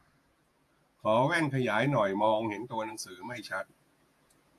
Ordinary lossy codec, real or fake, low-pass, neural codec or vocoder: AAC, 64 kbps; fake; 14.4 kHz; vocoder, 48 kHz, 128 mel bands, Vocos